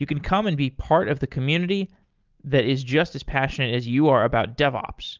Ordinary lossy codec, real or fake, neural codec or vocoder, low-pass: Opus, 32 kbps; real; none; 7.2 kHz